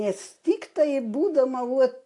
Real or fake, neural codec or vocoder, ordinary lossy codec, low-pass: real; none; AAC, 48 kbps; 10.8 kHz